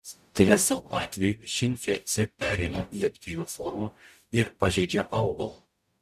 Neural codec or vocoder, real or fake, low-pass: codec, 44.1 kHz, 0.9 kbps, DAC; fake; 14.4 kHz